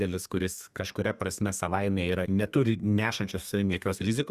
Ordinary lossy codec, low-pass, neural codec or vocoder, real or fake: Opus, 64 kbps; 14.4 kHz; codec, 32 kHz, 1.9 kbps, SNAC; fake